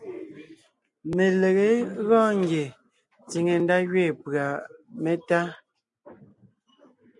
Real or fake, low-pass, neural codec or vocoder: real; 10.8 kHz; none